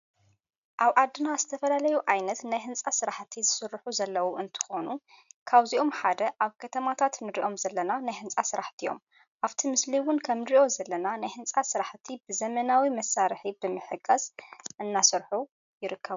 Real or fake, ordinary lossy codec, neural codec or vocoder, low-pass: real; MP3, 96 kbps; none; 7.2 kHz